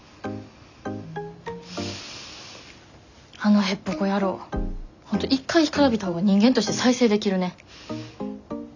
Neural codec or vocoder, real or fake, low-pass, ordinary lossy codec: none; real; 7.2 kHz; none